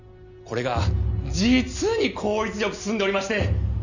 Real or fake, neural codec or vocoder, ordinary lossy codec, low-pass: real; none; none; 7.2 kHz